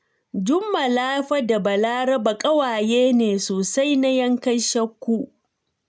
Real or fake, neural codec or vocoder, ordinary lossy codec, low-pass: real; none; none; none